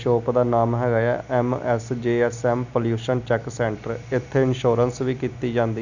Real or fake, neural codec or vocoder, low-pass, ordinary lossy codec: real; none; 7.2 kHz; none